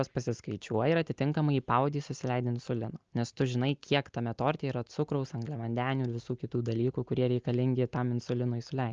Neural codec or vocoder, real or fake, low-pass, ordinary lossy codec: none; real; 7.2 kHz; Opus, 24 kbps